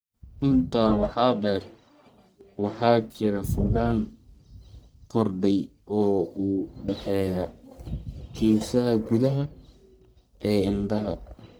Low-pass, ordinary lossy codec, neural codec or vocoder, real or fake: none; none; codec, 44.1 kHz, 1.7 kbps, Pupu-Codec; fake